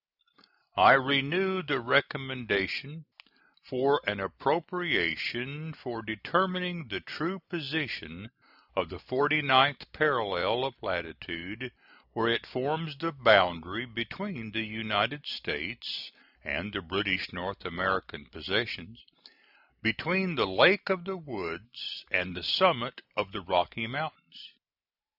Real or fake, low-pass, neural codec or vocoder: real; 5.4 kHz; none